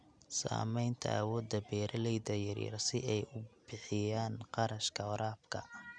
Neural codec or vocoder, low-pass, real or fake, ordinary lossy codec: none; 9.9 kHz; real; none